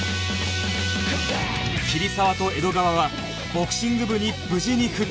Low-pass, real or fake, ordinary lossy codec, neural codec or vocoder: none; real; none; none